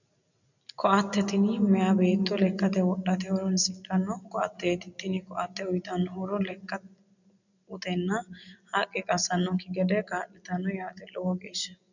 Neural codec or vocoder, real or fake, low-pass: none; real; 7.2 kHz